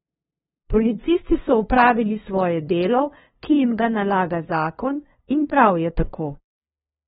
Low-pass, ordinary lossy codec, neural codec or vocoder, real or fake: 7.2 kHz; AAC, 16 kbps; codec, 16 kHz, 2 kbps, FunCodec, trained on LibriTTS, 25 frames a second; fake